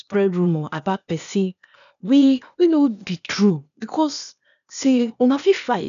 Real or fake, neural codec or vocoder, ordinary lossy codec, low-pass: fake; codec, 16 kHz, 0.8 kbps, ZipCodec; none; 7.2 kHz